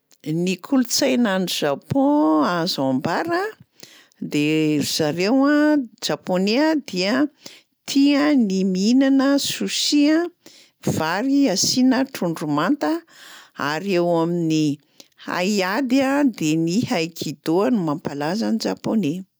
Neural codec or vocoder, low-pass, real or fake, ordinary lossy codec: none; none; real; none